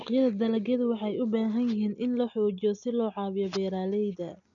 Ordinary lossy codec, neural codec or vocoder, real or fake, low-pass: none; none; real; 7.2 kHz